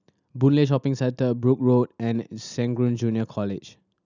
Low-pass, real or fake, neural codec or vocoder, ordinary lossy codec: 7.2 kHz; real; none; none